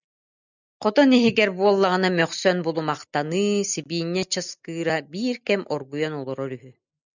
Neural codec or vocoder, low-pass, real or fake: none; 7.2 kHz; real